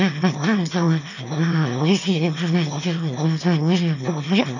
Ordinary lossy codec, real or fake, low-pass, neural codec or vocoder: none; fake; 7.2 kHz; autoencoder, 22.05 kHz, a latent of 192 numbers a frame, VITS, trained on one speaker